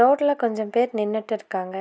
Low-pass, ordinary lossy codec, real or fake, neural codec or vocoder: none; none; real; none